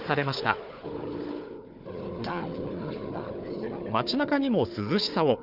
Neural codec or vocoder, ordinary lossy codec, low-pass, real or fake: codec, 16 kHz, 4 kbps, FunCodec, trained on Chinese and English, 50 frames a second; none; 5.4 kHz; fake